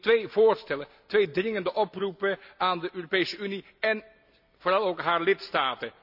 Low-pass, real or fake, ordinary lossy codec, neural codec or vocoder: 5.4 kHz; real; none; none